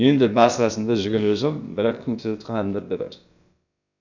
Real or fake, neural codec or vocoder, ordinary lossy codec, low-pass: fake; codec, 16 kHz, about 1 kbps, DyCAST, with the encoder's durations; none; 7.2 kHz